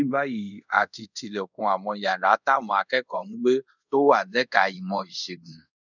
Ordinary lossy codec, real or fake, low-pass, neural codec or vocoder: none; fake; 7.2 kHz; codec, 24 kHz, 0.5 kbps, DualCodec